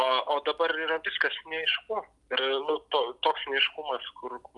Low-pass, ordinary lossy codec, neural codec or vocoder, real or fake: 10.8 kHz; Opus, 32 kbps; none; real